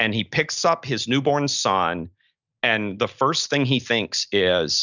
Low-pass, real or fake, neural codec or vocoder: 7.2 kHz; real; none